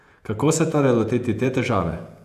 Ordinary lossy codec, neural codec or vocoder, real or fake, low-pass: none; vocoder, 48 kHz, 128 mel bands, Vocos; fake; 14.4 kHz